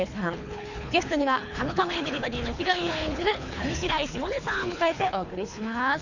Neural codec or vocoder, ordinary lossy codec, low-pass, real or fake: codec, 24 kHz, 3 kbps, HILCodec; none; 7.2 kHz; fake